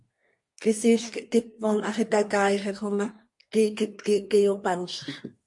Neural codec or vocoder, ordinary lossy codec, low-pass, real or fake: codec, 24 kHz, 1 kbps, SNAC; MP3, 48 kbps; 10.8 kHz; fake